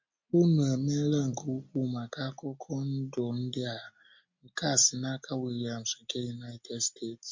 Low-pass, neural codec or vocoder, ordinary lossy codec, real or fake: 7.2 kHz; none; MP3, 48 kbps; real